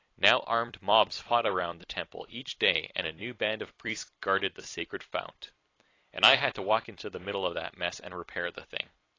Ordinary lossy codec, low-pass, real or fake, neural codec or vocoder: AAC, 32 kbps; 7.2 kHz; fake; vocoder, 44.1 kHz, 128 mel bands every 256 samples, BigVGAN v2